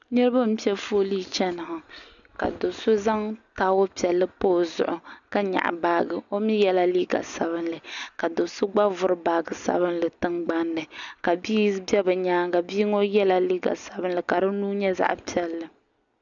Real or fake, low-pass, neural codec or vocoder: real; 7.2 kHz; none